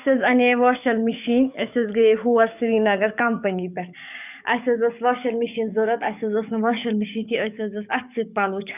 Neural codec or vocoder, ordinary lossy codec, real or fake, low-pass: codec, 44.1 kHz, 7.8 kbps, DAC; none; fake; 3.6 kHz